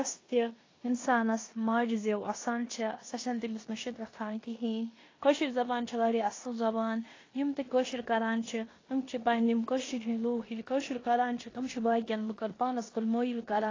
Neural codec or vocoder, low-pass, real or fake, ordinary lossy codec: codec, 16 kHz in and 24 kHz out, 0.9 kbps, LongCat-Audio-Codec, four codebook decoder; 7.2 kHz; fake; AAC, 32 kbps